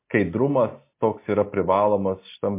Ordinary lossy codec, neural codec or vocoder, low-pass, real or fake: MP3, 24 kbps; none; 3.6 kHz; real